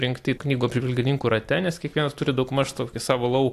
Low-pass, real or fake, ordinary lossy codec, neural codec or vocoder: 14.4 kHz; real; AAC, 64 kbps; none